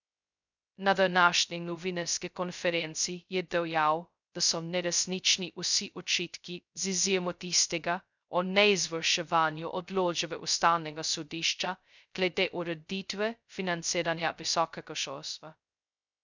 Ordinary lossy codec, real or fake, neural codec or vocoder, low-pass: none; fake; codec, 16 kHz, 0.2 kbps, FocalCodec; 7.2 kHz